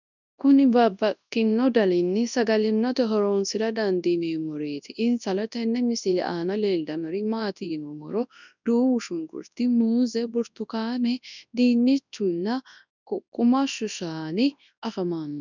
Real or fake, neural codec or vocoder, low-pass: fake; codec, 24 kHz, 0.9 kbps, WavTokenizer, large speech release; 7.2 kHz